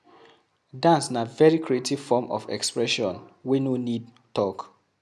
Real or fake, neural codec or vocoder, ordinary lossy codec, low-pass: real; none; none; none